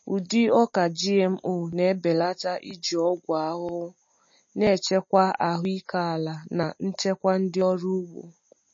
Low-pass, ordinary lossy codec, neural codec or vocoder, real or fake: 7.2 kHz; MP3, 32 kbps; none; real